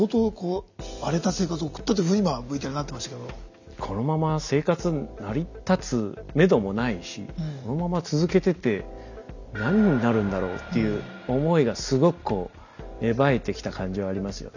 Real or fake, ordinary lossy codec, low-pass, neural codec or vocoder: real; none; 7.2 kHz; none